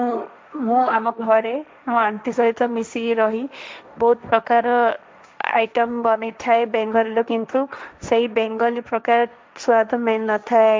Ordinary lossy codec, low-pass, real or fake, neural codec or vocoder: none; none; fake; codec, 16 kHz, 1.1 kbps, Voila-Tokenizer